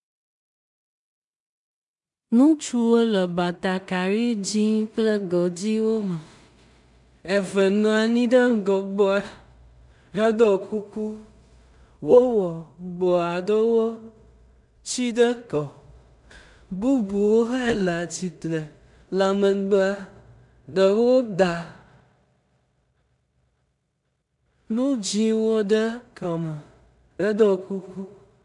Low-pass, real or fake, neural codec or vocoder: 10.8 kHz; fake; codec, 16 kHz in and 24 kHz out, 0.4 kbps, LongCat-Audio-Codec, two codebook decoder